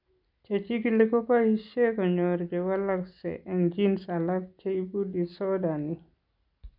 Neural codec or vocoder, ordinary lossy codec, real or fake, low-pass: none; none; real; 5.4 kHz